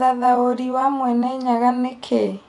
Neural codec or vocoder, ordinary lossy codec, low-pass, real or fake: vocoder, 24 kHz, 100 mel bands, Vocos; none; 10.8 kHz; fake